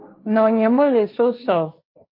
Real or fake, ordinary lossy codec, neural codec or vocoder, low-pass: fake; MP3, 32 kbps; codec, 16 kHz, 1.1 kbps, Voila-Tokenizer; 5.4 kHz